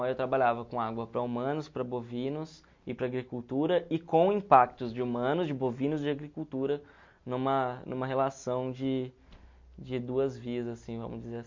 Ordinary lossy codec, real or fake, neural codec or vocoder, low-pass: MP3, 48 kbps; real; none; 7.2 kHz